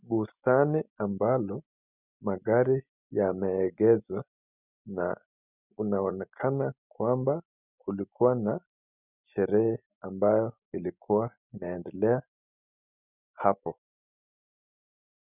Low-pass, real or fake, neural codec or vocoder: 3.6 kHz; real; none